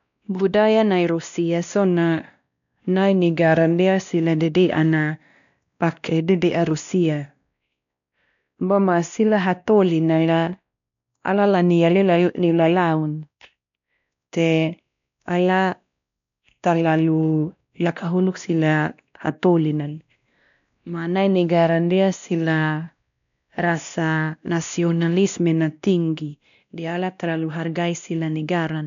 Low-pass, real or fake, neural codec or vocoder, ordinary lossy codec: 7.2 kHz; fake; codec, 16 kHz, 1 kbps, X-Codec, WavLM features, trained on Multilingual LibriSpeech; none